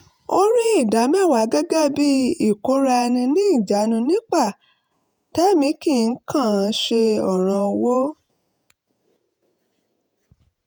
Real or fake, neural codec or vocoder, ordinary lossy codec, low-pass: fake; vocoder, 48 kHz, 128 mel bands, Vocos; none; none